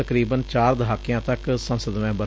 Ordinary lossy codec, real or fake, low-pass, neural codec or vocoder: none; real; none; none